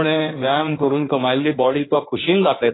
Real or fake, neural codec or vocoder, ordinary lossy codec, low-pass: fake; codec, 16 kHz in and 24 kHz out, 1.1 kbps, FireRedTTS-2 codec; AAC, 16 kbps; 7.2 kHz